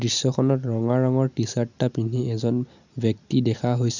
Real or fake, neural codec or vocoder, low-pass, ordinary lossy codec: real; none; 7.2 kHz; none